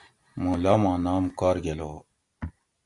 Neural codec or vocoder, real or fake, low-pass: none; real; 10.8 kHz